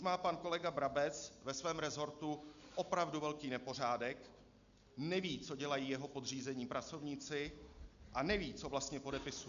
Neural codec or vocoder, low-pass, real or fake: none; 7.2 kHz; real